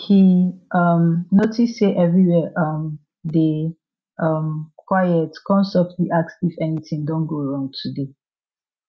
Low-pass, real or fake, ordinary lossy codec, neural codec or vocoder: none; real; none; none